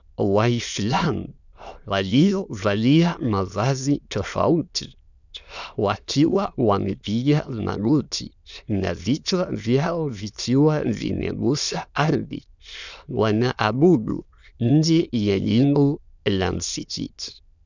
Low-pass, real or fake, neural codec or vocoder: 7.2 kHz; fake; autoencoder, 22.05 kHz, a latent of 192 numbers a frame, VITS, trained on many speakers